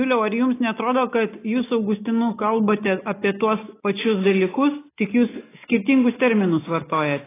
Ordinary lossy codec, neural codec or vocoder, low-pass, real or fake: AAC, 16 kbps; none; 3.6 kHz; real